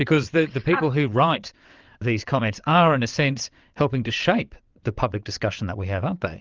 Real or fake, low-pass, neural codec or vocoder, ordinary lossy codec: fake; 7.2 kHz; vocoder, 22.05 kHz, 80 mel bands, WaveNeXt; Opus, 24 kbps